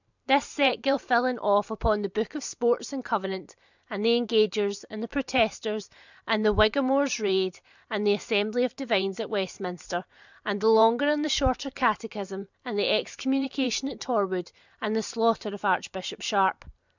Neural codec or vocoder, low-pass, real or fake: vocoder, 44.1 kHz, 128 mel bands every 512 samples, BigVGAN v2; 7.2 kHz; fake